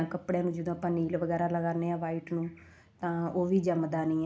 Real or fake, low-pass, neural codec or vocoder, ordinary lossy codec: real; none; none; none